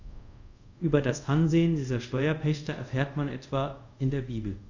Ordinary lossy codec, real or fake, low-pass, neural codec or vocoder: none; fake; 7.2 kHz; codec, 24 kHz, 0.5 kbps, DualCodec